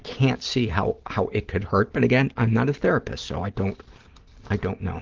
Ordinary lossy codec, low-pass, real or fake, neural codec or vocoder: Opus, 16 kbps; 7.2 kHz; real; none